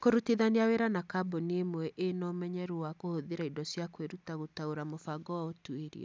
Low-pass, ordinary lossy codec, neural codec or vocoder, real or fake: none; none; none; real